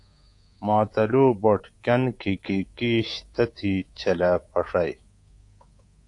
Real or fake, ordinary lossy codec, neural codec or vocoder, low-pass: fake; AAC, 48 kbps; codec, 24 kHz, 3.1 kbps, DualCodec; 10.8 kHz